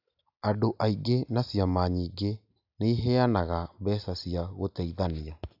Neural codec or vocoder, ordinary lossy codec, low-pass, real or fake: none; AAC, 48 kbps; 5.4 kHz; real